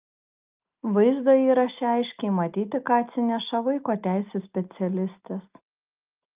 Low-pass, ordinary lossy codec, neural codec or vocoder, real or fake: 3.6 kHz; Opus, 24 kbps; none; real